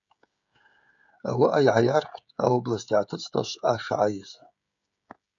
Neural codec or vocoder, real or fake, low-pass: codec, 16 kHz, 16 kbps, FreqCodec, smaller model; fake; 7.2 kHz